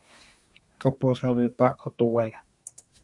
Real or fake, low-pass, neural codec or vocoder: fake; 10.8 kHz; codec, 24 kHz, 1 kbps, SNAC